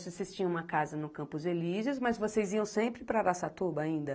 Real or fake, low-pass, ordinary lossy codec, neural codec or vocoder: real; none; none; none